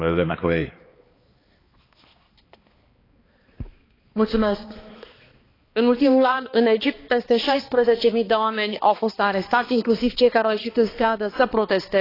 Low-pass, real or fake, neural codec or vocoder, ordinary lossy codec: 5.4 kHz; fake; codec, 16 kHz, 2 kbps, X-Codec, HuBERT features, trained on balanced general audio; AAC, 24 kbps